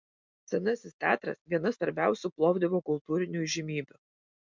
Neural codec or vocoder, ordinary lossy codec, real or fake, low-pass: none; MP3, 64 kbps; real; 7.2 kHz